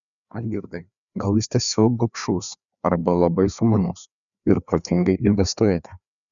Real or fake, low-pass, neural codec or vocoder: fake; 7.2 kHz; codec, 16 kHz, 2 kbps, FreqCodec, larger model